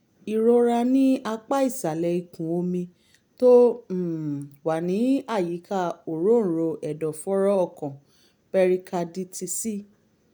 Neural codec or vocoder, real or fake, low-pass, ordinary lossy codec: none; real; none; none